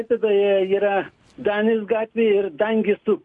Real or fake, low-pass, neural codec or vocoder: real; 10.8 kHz; none